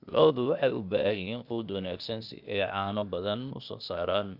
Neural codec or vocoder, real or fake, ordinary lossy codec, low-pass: codec, 16 kHz, 0.8 kbps, ZipCodec; fake; none; 5.4 kHz